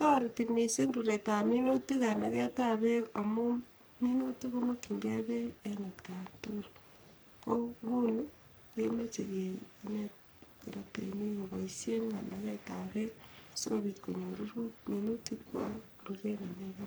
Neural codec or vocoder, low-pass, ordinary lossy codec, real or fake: codec, 44.1 kHz, 3.4 kbps, Pupu-Codec; none; none; fake